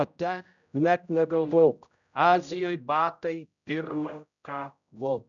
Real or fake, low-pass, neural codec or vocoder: fake; 7.2 kHz; codec, 16 kHz, 0.5 kbps, X-Codec, HuBERT features, trained on general audio